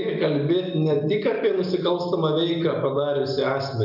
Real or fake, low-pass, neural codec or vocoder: real; 5.4 kHz; none